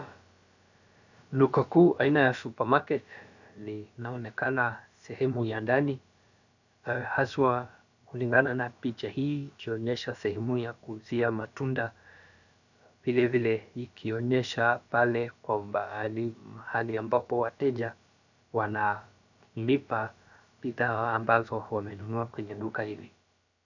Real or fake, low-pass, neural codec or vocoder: fake; 7.2 kHz; codec, 16 kHz, about 1 kbps, DyCAST, with the encoder's durations